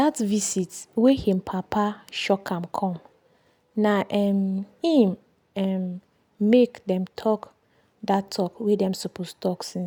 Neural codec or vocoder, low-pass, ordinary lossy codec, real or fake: none; none; none; real